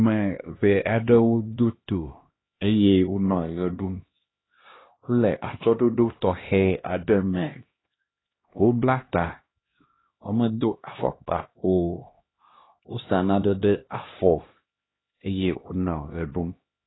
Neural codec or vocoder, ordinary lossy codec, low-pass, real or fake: codec, 16 kHz, 1 kbps, X-Codec, HuBERT features, trained on LibriSpeech; AAC, 16 kbps; 7.2 kHz; fake